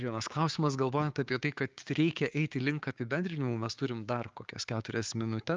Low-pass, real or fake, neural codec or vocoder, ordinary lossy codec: 7.2 kHz; fake; codec, 16 kHz, 6 kbps, DAC; Opus, 24 kbps